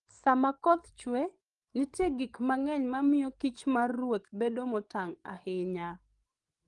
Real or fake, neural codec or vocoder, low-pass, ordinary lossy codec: fake; codec, 44.1 kHz, 7.8 kbps, DAC; 10.8 kHz; Opus, 24 kbps